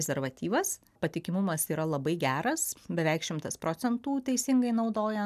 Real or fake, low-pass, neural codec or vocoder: real; 14.4 kHz; none